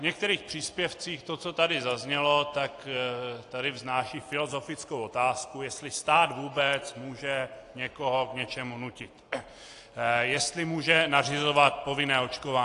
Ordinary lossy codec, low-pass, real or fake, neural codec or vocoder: AAC, 48 kbps; 10.8 kHz; real; none